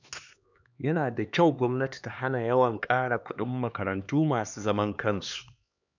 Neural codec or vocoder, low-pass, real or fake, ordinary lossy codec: codec, 16 kHz, 2 kbps, X-Codec, HuBERT features, trained on LibriSpeech; 7.2 kHz; fake; none